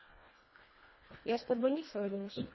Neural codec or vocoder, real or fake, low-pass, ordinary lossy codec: codec, 24 kHz, 1.5 kbps, HILCodec; fake; 7.2 kHz; MP3, 24 kbps